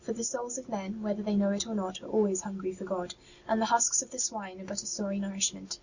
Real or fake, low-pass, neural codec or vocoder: real; 7.2 kHz; none